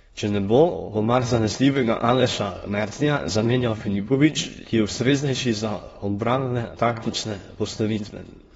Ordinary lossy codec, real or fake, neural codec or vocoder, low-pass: AAC, 24 kbps; fake; autoencoder, 22.05 kHz, a latent of 192 numbers a frame, VITS, trained on many speakers; 9.9 kHz